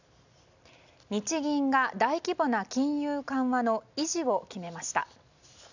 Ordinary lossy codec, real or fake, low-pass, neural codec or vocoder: none; real; 7.2 kHz; none